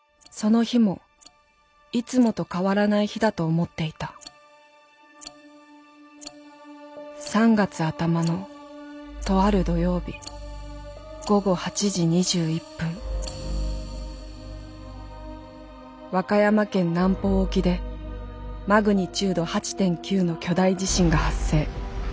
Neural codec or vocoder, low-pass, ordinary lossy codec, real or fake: none; none; none; real